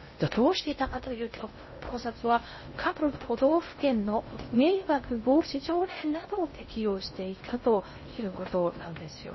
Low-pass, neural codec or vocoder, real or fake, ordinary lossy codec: 7.2 kHz; codec, 16 kHz in and 24 kHz out, 0.6 kbps, FocalCodec, streaming, 2048 codes; fake; MP3, 24 kbps